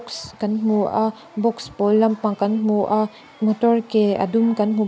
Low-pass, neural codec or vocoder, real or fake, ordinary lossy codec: none; none; real; none